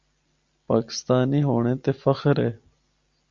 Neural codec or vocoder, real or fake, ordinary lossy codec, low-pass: none; real; Opus, 64 kbps; 7.2 kHz